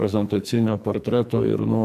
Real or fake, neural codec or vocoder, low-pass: fake; codec, 44.1 kHz, 2.6 kbps, SNAC; 14.4 kHz